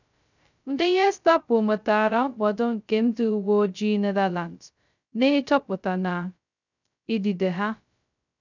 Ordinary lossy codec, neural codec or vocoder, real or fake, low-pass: none; codec, 16 kHz, 0.2 kbps, FocalCodec; fake; 7.2 kHz